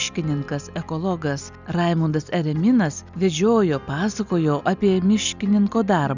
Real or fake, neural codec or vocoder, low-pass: real; none; 7.2 kHz